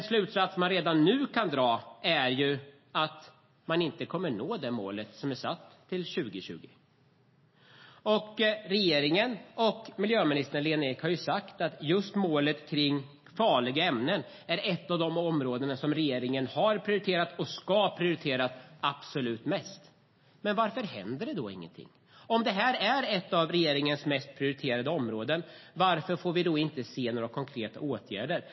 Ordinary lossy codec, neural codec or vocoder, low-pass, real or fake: MP3, 24 kbps; none; 7.2 kHz; real